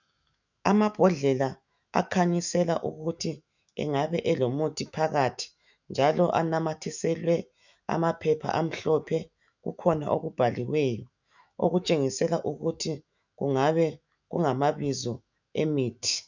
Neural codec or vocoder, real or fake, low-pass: autoencoder, 48 kHz, 128 numbers a frame, DAC-VAE, trained on Japanese speech; fake; 7.2 kHz